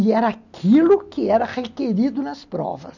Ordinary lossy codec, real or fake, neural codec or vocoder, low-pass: none; real; none; 7.2 kHz